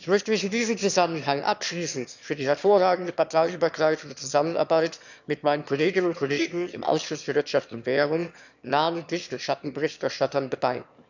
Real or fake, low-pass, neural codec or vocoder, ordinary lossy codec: fake; 7.2 kHz; autoencoder, 22.05 kHz, a latent of 192 numbers a frame, VITS, trained on one speaker; none